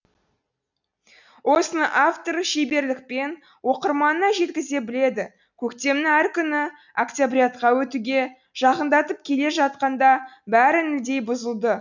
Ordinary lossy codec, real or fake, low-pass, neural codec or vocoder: none; real; 7.2 kHz; none